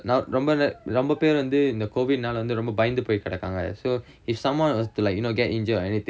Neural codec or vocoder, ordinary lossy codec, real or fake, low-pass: none; none; real; none